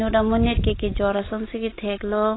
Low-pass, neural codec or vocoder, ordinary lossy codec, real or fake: 7.2 kHz; none; AAC, 16 kbps; real